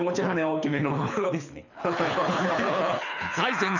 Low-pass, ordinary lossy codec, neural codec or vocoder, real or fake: 7.2 kHz; none; codec, 24 kHz, 6 kbps, HILCodec; fake